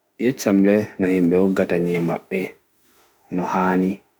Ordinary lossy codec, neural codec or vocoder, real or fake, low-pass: none; autoencoder, 48 kHz, 32 numbers a frame, DAC-VAE, trained on Japanese speech; fake; none